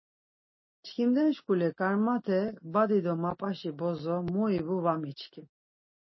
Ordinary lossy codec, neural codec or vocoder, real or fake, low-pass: MP3, 24 kbps; none; real; 7.2 kHz